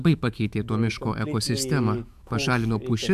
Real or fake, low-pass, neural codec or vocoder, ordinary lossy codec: fake; 14.4 kHz; autoencoder, 48 kHz, 128 numbers a frame, DAC-VAE, trained on Japanese speech; AAC, 96 kbps